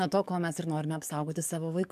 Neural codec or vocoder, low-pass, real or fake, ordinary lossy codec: codec, 44.1 kHz, 7.8 kbps, DAC; 14.4 kHz; fake; MP3, 96 kbps